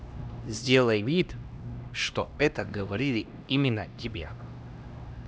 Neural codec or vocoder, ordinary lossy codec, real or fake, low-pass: codec, 16 kHz, 1 kbps, X-Codec, HuBERT features, trained on LibriSpeech; none; fake; none